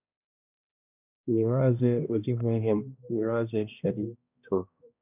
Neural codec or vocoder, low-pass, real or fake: codec, 16 kHz, 1 kbps, X-Codec, HuBERT features, trained on balanced general audio; 3.6 kHz; fake